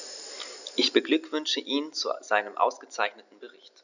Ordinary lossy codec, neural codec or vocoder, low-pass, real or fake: none; none; 7.2 kHz; real